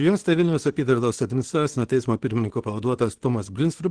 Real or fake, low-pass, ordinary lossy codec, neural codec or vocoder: fake; 9.9 kHz; Opus, 16 kbps; codec, 24 kHz, 0.9 kbps, WavTokenizer, small release